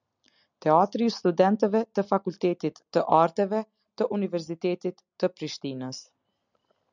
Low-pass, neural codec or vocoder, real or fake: 7.2 kHz; none; real